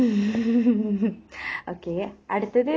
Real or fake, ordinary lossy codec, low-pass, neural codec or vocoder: real; none; none; none